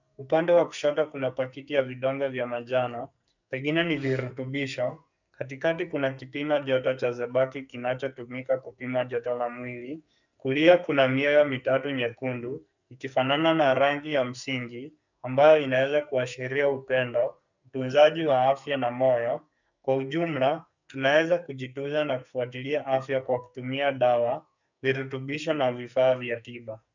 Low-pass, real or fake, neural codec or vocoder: 7.2 kHz; fake; codec, 44.1 kHz, 2.6 kbps, SNAC